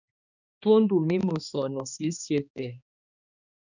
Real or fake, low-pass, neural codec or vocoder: fake; 7.2 kHz; codec, 16 kHz, 2 kbps, X-Codec, HuBERT features, trained on balanced general audio